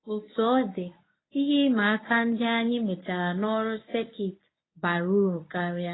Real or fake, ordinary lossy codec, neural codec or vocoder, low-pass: fake; AAC, 16 kbps; codec, 24 kHz, 0.9 kbps, WavTokenizer, medium speech release version 1; 7.2 kHz